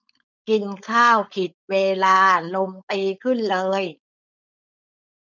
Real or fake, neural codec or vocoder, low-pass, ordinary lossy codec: fake; codec, 16 kHz, 4.8 kbps, FACodec; 7.2 kHz; none